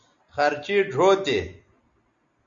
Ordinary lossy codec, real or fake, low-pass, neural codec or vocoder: Opus, 64 kbps; real; 7.2 kHz; none